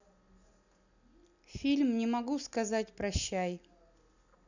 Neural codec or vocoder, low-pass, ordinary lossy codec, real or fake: none; 7.2 kHz; none; real